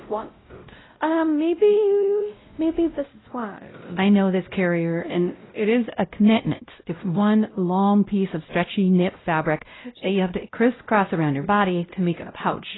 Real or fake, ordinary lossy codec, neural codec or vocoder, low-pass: fake; AAC, 16 kbps; codec, 16 kHz, 0.5 kbps, X-Codec, WavLM features, trained on Multilingual LibriSpeech; 7.2 kHz